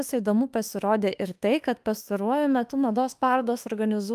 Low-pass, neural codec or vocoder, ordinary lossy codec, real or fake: 14.4 kHz; autoencoder, 48 kHz, 32 numbers a frame, DAC-VAE, trained on Japanese speech; Opus, 32 kbps; fake